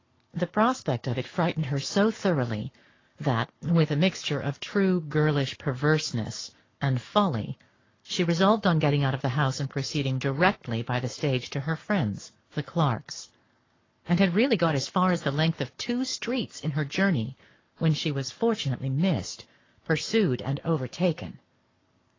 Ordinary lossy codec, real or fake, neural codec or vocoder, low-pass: AAC, 32 kbps; fake; codec, 44.1 kHz, 7.8 kbps, Pupu-Codec; 7.2 kHz